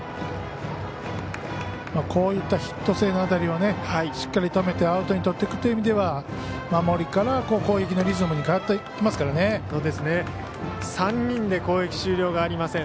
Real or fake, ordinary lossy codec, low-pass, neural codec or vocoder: real; none; none; none